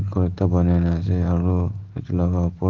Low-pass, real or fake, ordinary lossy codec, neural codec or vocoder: 7.2 kHz; real; Opus, 16 kbps; none